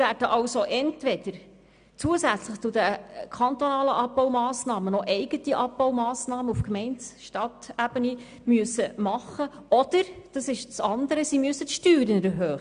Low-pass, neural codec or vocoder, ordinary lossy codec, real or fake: 9.9 kHz; none; AAC, 96 kbps; real